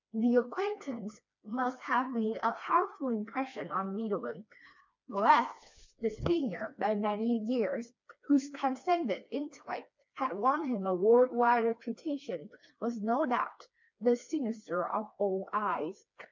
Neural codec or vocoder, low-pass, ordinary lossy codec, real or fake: codec, 16 kHz, 2 kbps, FreqCodec, smaller model; 7.2 kHz; MP3, 64 kbps; fake